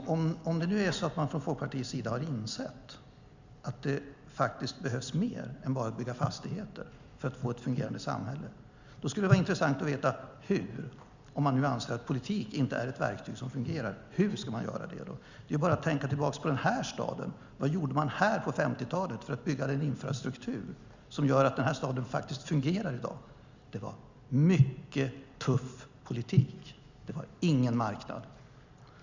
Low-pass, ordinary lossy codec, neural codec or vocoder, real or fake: 7.2 kHz; Opus, 64 kbps; none; real